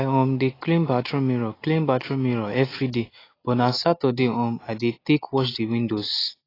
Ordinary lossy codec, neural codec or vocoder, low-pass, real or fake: AAC, 24 kbps; none; 5.4 kHz; real